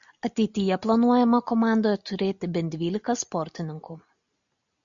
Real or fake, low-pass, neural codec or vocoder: real; 7.2 kHz; none